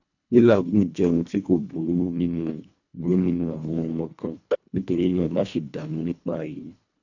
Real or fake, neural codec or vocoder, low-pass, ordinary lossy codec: fake; codec, 24 kHz, 1.5 kbps, HILCodec; 7.2 kHz; none